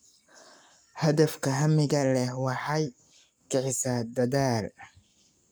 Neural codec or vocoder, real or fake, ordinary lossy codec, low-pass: codec, 44.1 kHz, 7.8 kbps, Pupu-Codec; fake; none; none